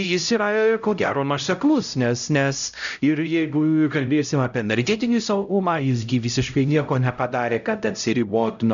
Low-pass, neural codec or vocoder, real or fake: 7.2 kHz; codec, 16 kHz, 0.5 kbps, X-Codec, HuBERT features, trained on LibriSpeech; fake